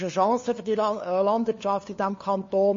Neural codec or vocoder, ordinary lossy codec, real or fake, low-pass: codec, 16 kHz, 2 kbps, FunCodec, trained on LibriTTS, 25 frames a second; MP3, 32 kbps; fake; 7.2 kHz